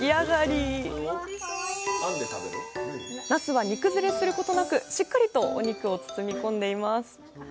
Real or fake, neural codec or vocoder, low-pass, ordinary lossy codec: real; none; none; none